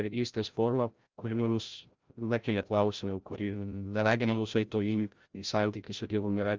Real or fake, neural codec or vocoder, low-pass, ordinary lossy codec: fake; codec, 16 kHz, 0.5 kbps, FreqCodec, larger model; 7.2 kHz; Opus, 32 kbps